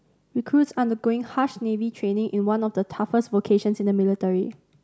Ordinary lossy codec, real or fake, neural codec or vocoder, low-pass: none; real; none; none